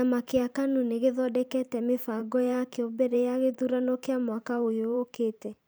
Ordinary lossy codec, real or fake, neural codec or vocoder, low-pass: none; fake; vocoder, 44.1 kHz, 128 mel bands every 512 samples, BigVGAN v2; none